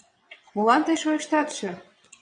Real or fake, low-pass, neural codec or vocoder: fake; 9.9 kHz; vocoder, 22.05 kHz, 80 mel bands, WaveNeXt